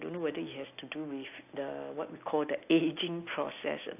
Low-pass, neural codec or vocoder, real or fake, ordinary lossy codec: 3.6 kHz; none; real; AAC, 32 kbps